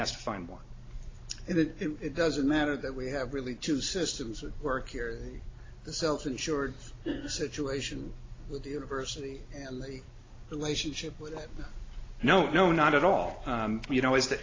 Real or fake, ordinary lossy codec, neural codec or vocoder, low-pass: real; AAC, 32 kbps; none; 7.2 kHz